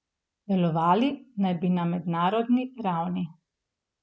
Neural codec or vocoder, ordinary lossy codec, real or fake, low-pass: none; none; real; none